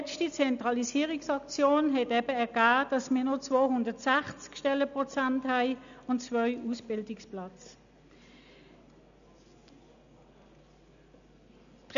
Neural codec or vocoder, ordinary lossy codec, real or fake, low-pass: none; none; real; 7.2 kHz